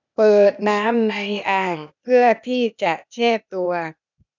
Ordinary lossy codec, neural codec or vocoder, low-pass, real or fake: none; codec, 16 kHz, 0.8 kbps, ZipCodec; 7.2 kHz; fake